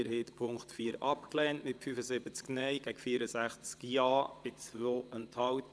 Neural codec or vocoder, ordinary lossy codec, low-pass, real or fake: vocoder, 22.05 kHz, 80 mel bands, WaveNeXt; none; none; fake